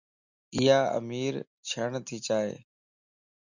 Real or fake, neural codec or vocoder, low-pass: real; none; 7.2 kHz